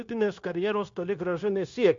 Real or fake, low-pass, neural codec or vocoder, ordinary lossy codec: fake; 7.2 kHz; codec, 16 kHz, 0.9 kbps, LongCat-Audio-Codec; MP3, 48 kbps